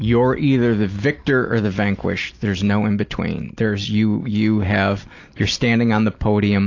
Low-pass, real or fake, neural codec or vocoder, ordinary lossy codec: 7.2 kHz; real; none; AAC, 48 kbps